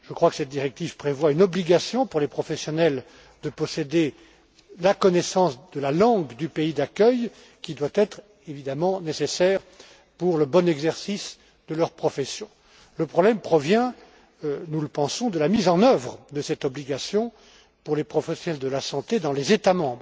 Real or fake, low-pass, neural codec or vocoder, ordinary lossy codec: real; none; none; none